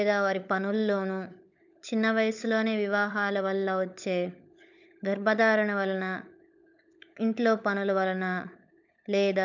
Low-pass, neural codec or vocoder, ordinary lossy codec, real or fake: 7.2 kHz; codec, 16 kHz, 16 kbps, FunCodec, trained on LibriTTS, 50 frames a second; none; fake